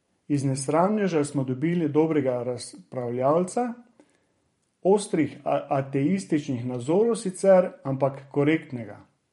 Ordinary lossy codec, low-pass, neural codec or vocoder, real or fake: MP3, 48 kbps; 19.8 kHz; vocoder, 44.1 kHz, 128 mel bands every 512 samples, BigVGAN v2; fake